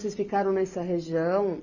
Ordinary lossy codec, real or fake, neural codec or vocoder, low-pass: none; real; none; 7.2 kHz